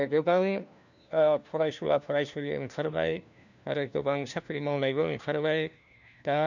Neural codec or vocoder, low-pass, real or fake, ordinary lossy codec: codec, 16 kHz, 1 kbps, FunCodec, trained on LibriTTS, 50 frames a second; 7.2 kHz; fake; none